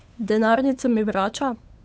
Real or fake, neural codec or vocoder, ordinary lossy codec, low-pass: fake; codec, 16 kHz, 8 kbps, FunCodec, trained on Chinese and English, 25 frames a second; none; none